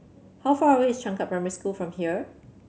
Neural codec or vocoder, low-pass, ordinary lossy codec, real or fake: none; none; none; real